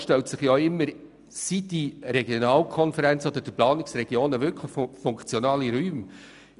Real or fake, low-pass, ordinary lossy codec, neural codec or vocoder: real; 10.8 kHz; none; none